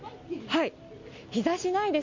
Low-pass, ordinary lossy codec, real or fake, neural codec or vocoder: 7.2 kHz; MP3, 48 kbps; real; none